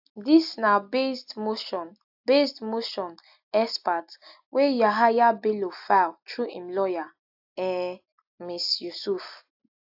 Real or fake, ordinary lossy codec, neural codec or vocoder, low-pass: real; AAC, 48 kbps; none; 5.4 kHz